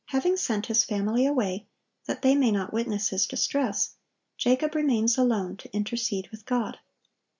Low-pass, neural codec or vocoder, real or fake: 7.2 kHz; none; real